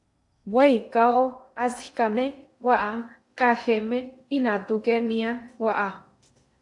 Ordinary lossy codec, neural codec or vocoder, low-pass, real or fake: AAC, 64 kbps; codec, 16 kHz in and 24 kHz out, 0.6 kbps, FocalCodec, streaming, 2048 codes; 10.8 kHz; fake